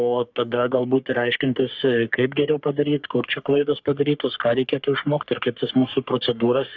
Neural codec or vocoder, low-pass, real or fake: codec, 44.1 kHz, 3.4 kbps, Pupu-Codec; 7.2 kHz; fake